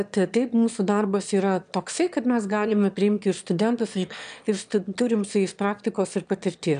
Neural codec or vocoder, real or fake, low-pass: autoencoder, 22.05 kHz, a latent of 192 numbers a frame, VITS, trained on one speaker; fake; 9.9 kHz